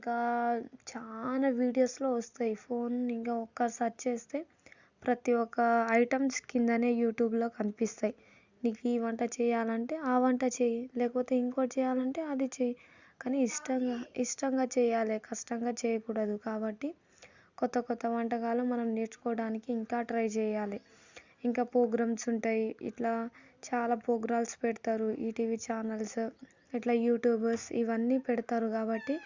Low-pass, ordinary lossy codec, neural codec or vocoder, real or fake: 7.2 kHz; Opus, 64 kbps; none; real